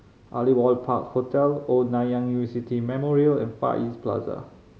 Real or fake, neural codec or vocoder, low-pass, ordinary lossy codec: real; none; none; none